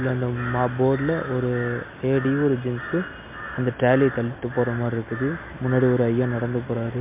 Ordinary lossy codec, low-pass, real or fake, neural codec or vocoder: MP3, 32 kbps; 3.6 kHz; real; none